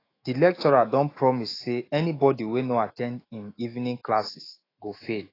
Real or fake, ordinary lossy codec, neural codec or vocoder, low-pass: fake; AAC, 24 kbps; autoencoder, 48 kHz, 128 numbers a frame, DAC-VAE, trained on Japanese speech; 5.4 kHz